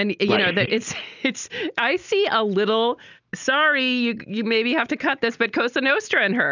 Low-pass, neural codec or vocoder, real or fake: 7.2 kHz; none; real